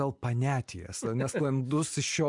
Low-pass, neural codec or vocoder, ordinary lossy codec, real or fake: 10.8 kHz; none; MP3, 64 kbps; real